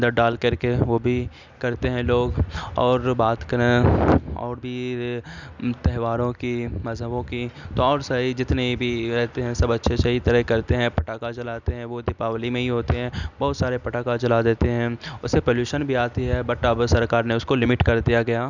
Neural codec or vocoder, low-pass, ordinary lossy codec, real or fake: none; 7.2 kHz; none; real